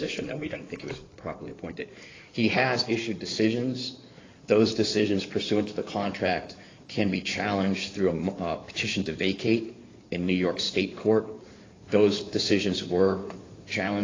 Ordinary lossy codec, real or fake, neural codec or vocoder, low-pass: AAC, 32 kbps; fake; codec, 16 kHz in and 24 kHz out, 2.2 kbps, FireRedTTS-2 codec; 7.2 kHz